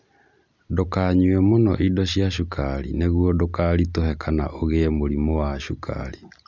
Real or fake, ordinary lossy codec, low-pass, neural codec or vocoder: real; none; 7.2 kHz; none